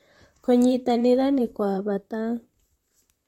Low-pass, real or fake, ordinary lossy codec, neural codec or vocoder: 19.8 kHz; fake; MP3, 64 kbps; vocoder, 44.1 kHz, 128 mel bands, Pupu-Vocoder